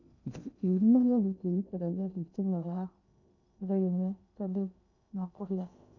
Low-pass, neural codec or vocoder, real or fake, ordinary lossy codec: 7.2 kHz; codec, 16 kHz in and 24 kHz out, 0.6 kbps, FocalCodec, streaming, 2048 codes; fake; none